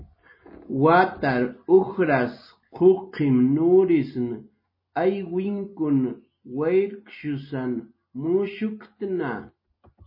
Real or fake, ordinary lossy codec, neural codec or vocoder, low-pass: real; MP3, 24 kbps; none; 5.4 kHz